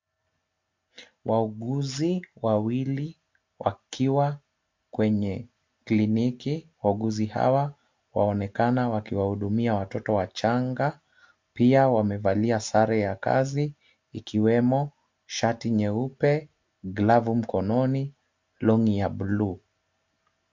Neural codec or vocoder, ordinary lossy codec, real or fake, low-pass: none; MP3, 48 kbps; real; 7.2 kHz